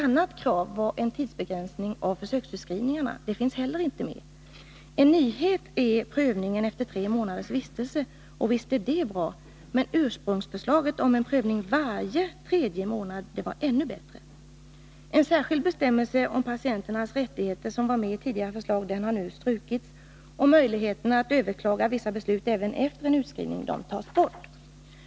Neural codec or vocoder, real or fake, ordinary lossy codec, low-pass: none; real; none; none